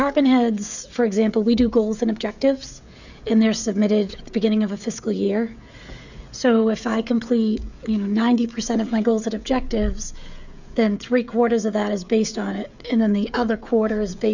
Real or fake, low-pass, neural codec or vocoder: fake; 7.2 kHz; codec, 16 kHz, 16 kbps, FreqCodec, smaller model